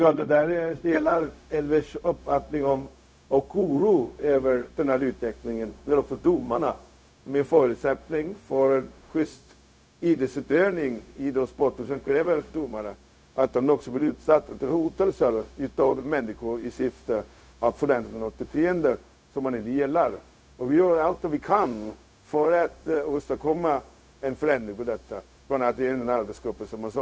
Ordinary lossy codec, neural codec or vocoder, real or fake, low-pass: none; codec, 16 kHz, 0.4 kbps, LongCat-Audio-Codec; fake; none